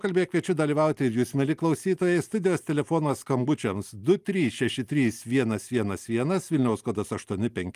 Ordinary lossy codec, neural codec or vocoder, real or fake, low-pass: Opus, 32 kbps; none; real; 14.4 kHz